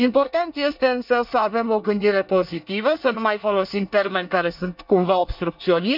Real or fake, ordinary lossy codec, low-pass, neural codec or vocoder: fake; none; 5.4 kHz; codec, 24 kHz, 1 kbps, SNAC